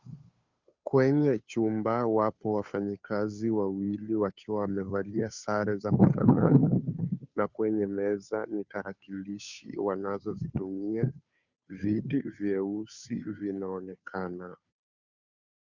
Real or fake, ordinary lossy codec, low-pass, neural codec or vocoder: fake; Opus, 64 kbps; 7.2 kHz; codec, 16 kHz, 2 kbps, FunCodec, trained on Chinese and English, 25 frames a second